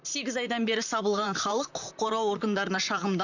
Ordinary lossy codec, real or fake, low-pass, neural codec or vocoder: none; fake; 7.2 kHz; vocoder, 44.1 kHz, 128 mel bands, Pupu-Vocoder